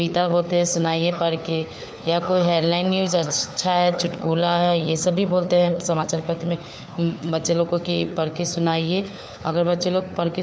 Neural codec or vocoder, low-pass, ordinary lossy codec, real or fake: codec, 16 kHz, 4 kbps, FunCodec, trained on LibriTTS, 50 frames a second; none; none; fake